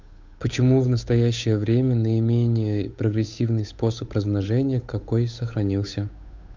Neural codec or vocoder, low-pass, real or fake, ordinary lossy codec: codec, 16 kHz, 8 kbps, FunCodec, trained on Chinese and English, 25 frames a second; 7.2 kHz; fake; MP3, 64 kbps